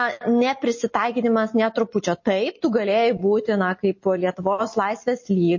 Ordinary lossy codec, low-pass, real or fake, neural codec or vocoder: MP3, 32 kbps; 7.2 kHz; real; none